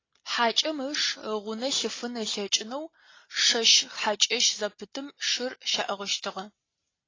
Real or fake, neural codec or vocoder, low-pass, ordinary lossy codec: real; none; 7.2 kHz; AAC, 32 kbps